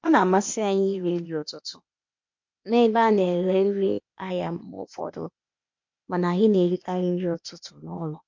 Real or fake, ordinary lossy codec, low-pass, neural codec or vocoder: fake; MP3, 48 kbps; 7.2 kHz; codec, 16 kHz, 0.8 kbps, ZipCodec